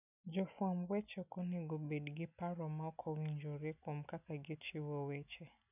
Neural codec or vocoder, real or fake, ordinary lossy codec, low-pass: none; real; none; 3.6 kHz